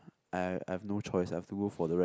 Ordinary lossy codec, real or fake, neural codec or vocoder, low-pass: none; real; none; none